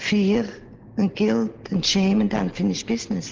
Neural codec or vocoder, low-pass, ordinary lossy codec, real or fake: none; 7.2 kHz; Opus, 16 kbps; real